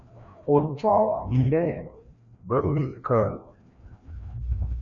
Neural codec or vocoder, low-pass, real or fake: codec, 16 kHz, 1 kbps, FreqCodec, larger model; 7.2 kHz; fake